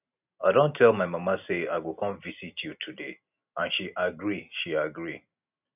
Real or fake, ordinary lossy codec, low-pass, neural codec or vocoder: real; none; 3.6 kHz; none